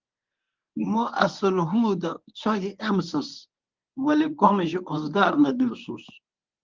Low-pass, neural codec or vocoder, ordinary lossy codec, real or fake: 7.2 kHz; codec, 24 kHz, 0.9 kbps, WavTokenizer, medium speech release version 1; Opus, 32 kbps; fake